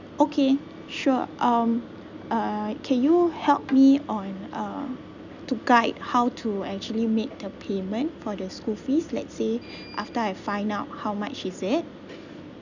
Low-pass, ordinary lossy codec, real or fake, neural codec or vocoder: 7.2 kHz; none; real; none